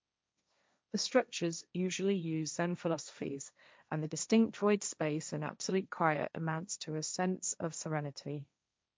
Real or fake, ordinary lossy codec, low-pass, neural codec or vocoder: fake; none; none; codec, 16 kHz, 1.1 kbps, Voila-Tokenizer